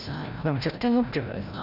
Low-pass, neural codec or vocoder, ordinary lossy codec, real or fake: 5.4 kHz; codec, 16 kHz, 0.5 kbps, FreqCodec, larger model; none; fake